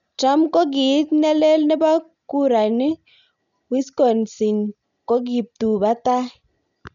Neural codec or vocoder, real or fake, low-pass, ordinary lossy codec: none; real; 7.2 kHz; none